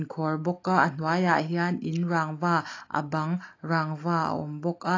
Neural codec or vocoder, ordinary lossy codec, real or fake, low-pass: none; AAC, 32 kbps; real; 7.2 kHz